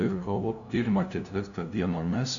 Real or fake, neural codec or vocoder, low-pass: fake; codec, 16 kHz, 0.5 kbps, FunCodec, trained on LibriTTS, 25 frames a second; 7.2 kHz